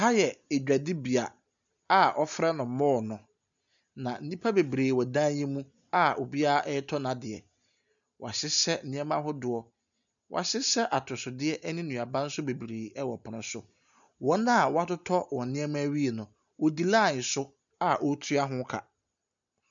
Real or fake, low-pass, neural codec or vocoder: real; 7.2 kHz; none